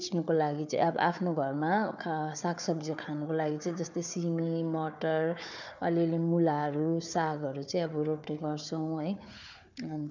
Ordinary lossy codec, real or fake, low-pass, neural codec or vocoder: none; fake; 7.2 kHz; codec, 16 kHz, 4 kbps, FunCodec, trained on Chinese and English, 50 frames a second